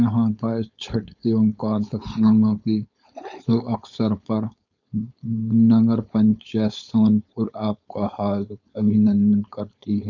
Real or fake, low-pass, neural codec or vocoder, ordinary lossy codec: fake; 7.2 kHz; codec, 16 kHz, 4.8 kbps, FACodec; none